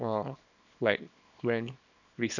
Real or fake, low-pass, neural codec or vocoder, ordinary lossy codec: fake; 7.2 kHz; codec, 24 kHz, 0.9 kbps, WavTokenizer, small release; none